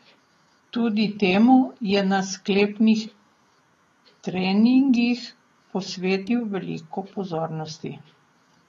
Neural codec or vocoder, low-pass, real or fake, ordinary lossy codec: autoencoder, 48 kHz, 128 numbers a frame, DAC-VAE, trained on Japanese speech; 19.8 kHz; fake; AAC, 32 kbps